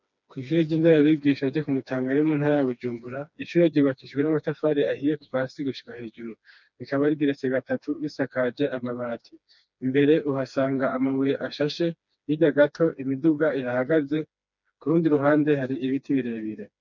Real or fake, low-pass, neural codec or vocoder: fake; 7.2 kHz; codec, 16 kHz, 2 kbps, FreqCodec, smaller model